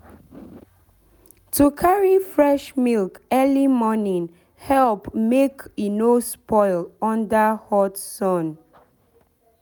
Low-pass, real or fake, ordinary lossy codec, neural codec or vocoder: none; real; none; none